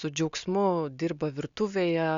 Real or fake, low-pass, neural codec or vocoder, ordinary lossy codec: real; 7.2 kHz; none; Opus, 64 kbps